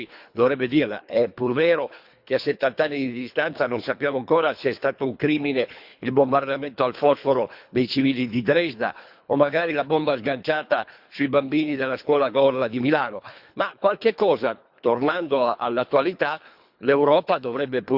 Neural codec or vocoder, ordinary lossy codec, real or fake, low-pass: codec, 24 kHz, 3 kbps, HILCodec; Opus, 64 kbps; fake; 5.4 kHz